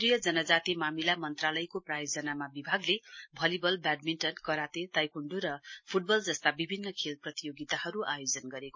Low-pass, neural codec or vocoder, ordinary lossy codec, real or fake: 7.2 kHz; none; MP3, 32 kbps; real